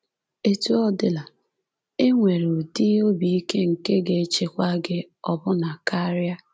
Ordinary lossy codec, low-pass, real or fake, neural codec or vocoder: none; none; real; none